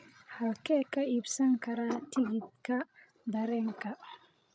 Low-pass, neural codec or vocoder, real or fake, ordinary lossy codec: none; codec, 16 kHz, 16 kbps, FreqCodec, larger model; fake; none